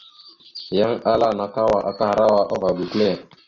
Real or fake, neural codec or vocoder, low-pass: real; none; 7.2 kHz